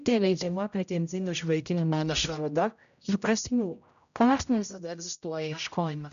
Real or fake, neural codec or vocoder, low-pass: fake; codec, 16 kHz, 0.5 kbps, X-Codec, HuBERT features, trained on general audio; 7.2 kHz